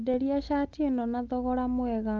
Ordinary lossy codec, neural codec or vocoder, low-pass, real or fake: Opus, 32 kbps; none; 7.2 kHz; real